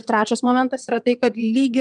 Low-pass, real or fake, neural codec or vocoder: 9.9 kHz; fake; vocoder, 22.05 kHz, 80 mel bands, WaveNeXt